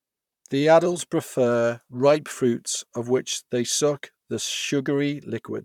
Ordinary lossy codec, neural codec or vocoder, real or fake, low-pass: none; vocoder, 44.1 kHz, 128 mel bands, Pupu-Vocoder; fake; 19.8 kHz